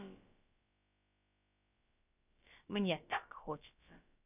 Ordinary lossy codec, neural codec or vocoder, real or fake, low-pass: none; codec, 16 kHz, about 1 kbps, DyCAST, with the encoder's durations; fake; 3.6 kHz